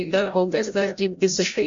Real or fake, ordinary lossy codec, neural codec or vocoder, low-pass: fake; MP3, 48 kbps; codec, 16 kHz, 0.5 kbps, FreqCodec, larger model; 7.2 kHz